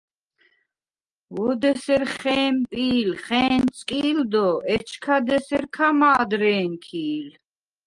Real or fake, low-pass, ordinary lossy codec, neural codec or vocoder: real; 10.8 kHz; Opus, 32 kbps; none